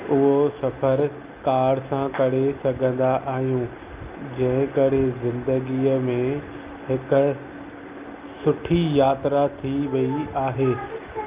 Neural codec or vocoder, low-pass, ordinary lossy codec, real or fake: none; 3.6 kHz; Opus, 24 kbps; real